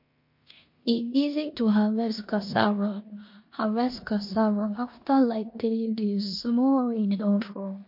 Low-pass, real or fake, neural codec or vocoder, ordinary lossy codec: 5.4 kHz; fake; codec, 16 kHz in and 24 kHz out, 0.9 kbps, LongCat-Audio-Codec, four codebook decoder; MP3, 32 kbps